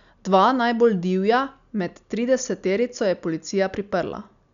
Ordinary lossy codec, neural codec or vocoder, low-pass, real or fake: none; none; 7.2 kHz; real